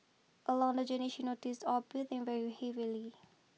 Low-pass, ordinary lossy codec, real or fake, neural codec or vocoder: none; none; real; none